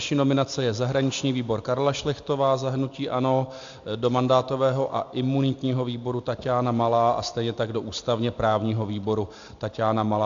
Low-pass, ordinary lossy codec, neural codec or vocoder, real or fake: 7.2 kHz; AAC, 64 kbps; none; real